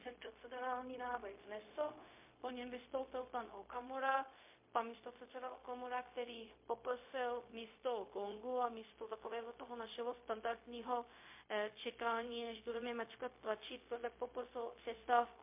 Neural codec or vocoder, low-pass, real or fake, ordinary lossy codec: codec, 16 kHz, 0.4 kbps, LongCat-Audio-Codec; 3.6 kHz; fake; MP3, 24 kbps